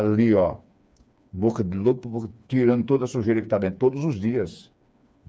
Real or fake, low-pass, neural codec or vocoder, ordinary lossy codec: fake; none; codec, 16 kHz, 4 kbps, FreqCodec, smaller model; none